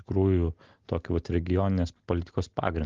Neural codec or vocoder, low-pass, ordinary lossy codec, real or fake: none; 7.2 kHz; Opus, 24 kbps; real